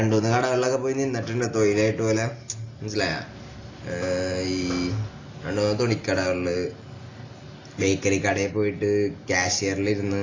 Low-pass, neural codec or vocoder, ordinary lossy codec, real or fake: 7.2 kHz; none; AAC, 32 kbps; real